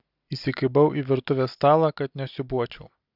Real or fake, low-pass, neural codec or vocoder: real; 5.4 kHz; none